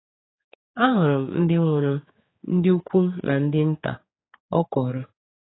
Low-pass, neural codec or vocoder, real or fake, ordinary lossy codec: 7.2 kHz; codec, 16 kHz, 4 kbps, X-Codec, HuBERT features, trained on general audio; fake; AAC, 16 kbps